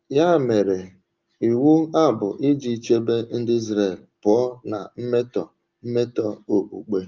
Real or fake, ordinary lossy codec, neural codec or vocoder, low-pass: real; Opus, 32 kbps; none; 7.2 kHz